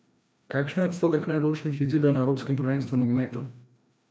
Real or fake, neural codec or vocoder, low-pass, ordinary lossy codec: fake; codec, 16 kHz, 1 kbps, FreqCodec, larger model; none; none